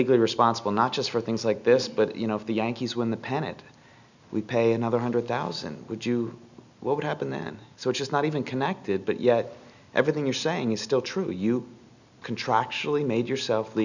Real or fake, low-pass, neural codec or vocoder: real; 7.2 kHz; none